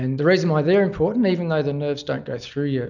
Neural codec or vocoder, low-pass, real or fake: none; 7.2 kHz; real